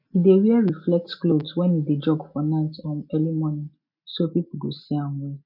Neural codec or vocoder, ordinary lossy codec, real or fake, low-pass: none; none; real; 5.4 kHz